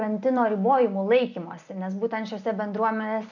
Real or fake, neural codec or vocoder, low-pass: fake; vocoder, 44.1 kHz, 128 mel bands every 256 samples, BigVGAN v2; 7.2 kHz